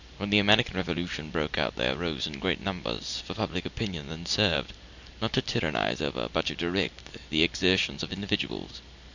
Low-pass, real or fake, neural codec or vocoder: 7.2 kHz; real; none